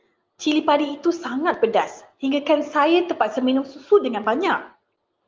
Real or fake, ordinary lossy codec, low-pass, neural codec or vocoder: real; Opus, 32 kbps; 7.2 kHz; none